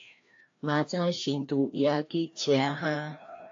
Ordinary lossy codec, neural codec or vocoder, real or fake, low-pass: AAC, 48 kbps; codec, 16 kHz, 1 kbps, FreqCodec, larger model; fake; 7.2 kHz